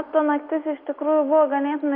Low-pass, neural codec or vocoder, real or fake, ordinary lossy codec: 5.4 kHz; none; real; Opus, 64 kbps